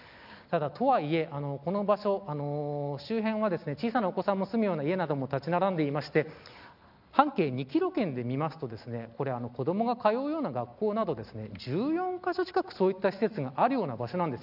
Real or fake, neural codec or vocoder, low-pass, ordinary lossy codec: real; none; 5.4 kHz; none